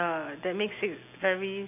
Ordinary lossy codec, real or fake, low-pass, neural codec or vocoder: none; real; 3.6 kHz; none